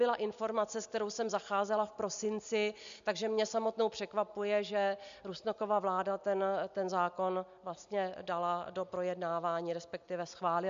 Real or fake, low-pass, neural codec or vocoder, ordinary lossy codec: real; 7.2 kHz; none; MP3, 64 kbps